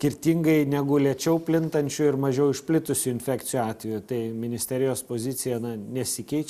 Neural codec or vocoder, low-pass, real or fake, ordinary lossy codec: none; 14.4 kHz; real; Opus, 64 kbps